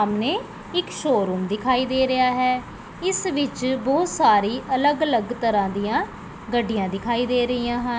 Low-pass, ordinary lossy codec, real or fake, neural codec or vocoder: none; none; real; none